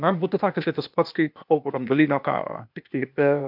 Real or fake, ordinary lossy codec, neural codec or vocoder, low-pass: fake; MP3, 48 kbps; codec, 16 kHz, 0.8 kbps, ZipCodec; 5.4 kHz